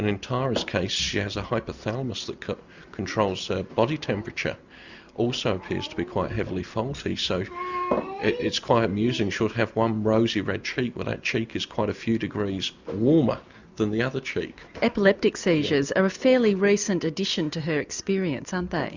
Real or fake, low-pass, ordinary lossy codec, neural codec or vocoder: real; 7.2 kHz; Opus, 64 kbps; none